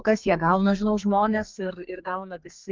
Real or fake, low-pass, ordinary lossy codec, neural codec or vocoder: fake; 7.2 kHz; Opus, 32 kbps; codec, 32 kHz, 1.9 kbps, SNAC